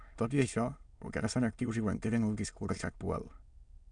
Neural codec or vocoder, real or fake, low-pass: autoencoder, 22.05 kHz, a latent of 192 numbers a frame, VITS, trained on many speakers; fake; 9.9 kHz